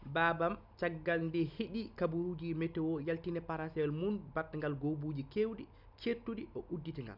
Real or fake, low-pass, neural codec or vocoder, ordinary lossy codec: real; 5.4 kHz; none; none